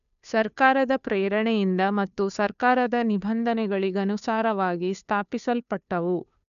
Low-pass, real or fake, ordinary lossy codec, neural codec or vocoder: 7.2 kHz; fake; none; codec, 16 kHz, 2 kbps, FunCodec, trained on Chinese and English, 25 frames a second